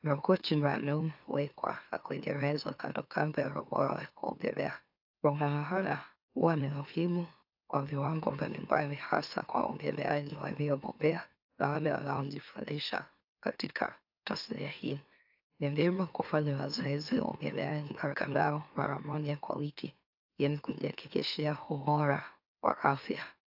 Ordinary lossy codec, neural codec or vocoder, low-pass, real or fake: AAC, 48 kbps; autoencoder, 44.1 kHz, a latent of 192 numbers a frame, MeloTTS; 5.4 kHz; fake